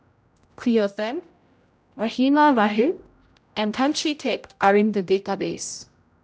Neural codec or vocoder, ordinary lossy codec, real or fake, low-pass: codec, 16 kHz, 0.5 kbps, X-Codec, HuBERT features, trained on general audio; none; fake; none